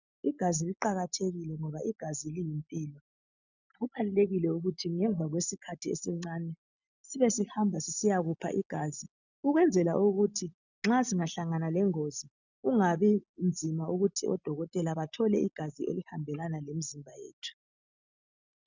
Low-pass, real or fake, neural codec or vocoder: 7.2 kHz; real; none